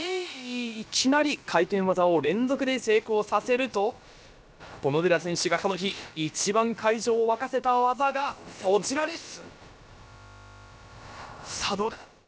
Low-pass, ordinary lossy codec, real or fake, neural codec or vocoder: none; none; fake; codec, 16 kHz, about 1 kbps, DyCAST, with the encoder's durations